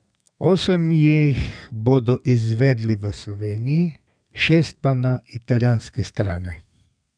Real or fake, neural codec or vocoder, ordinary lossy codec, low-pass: fake; codec, 32 kHz, 1.9 kbps, SNAC; none; 9.9 kHz